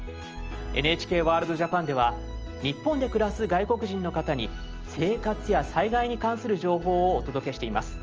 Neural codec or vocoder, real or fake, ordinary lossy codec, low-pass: none; real; Opus, 24 kbps; 7.2 kHz